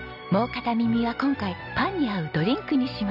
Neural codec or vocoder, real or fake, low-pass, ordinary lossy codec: none; real; 5.4 kHz; none